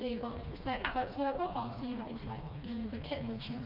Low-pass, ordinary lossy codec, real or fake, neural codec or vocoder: 5.4 kHz; none; fake; codec, 16 kHz, 2 kbps, FreqCodec, smaller model